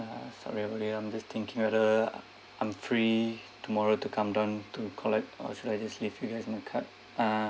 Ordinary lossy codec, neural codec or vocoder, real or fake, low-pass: none; none; real; none